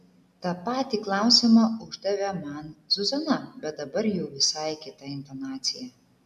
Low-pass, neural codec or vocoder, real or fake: 14.4 kHz; none; real